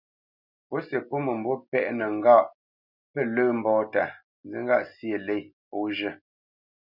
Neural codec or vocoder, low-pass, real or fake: none; 5.4 kHz; real